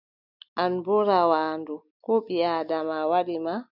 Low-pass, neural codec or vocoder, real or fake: 5.4 kHz; none; real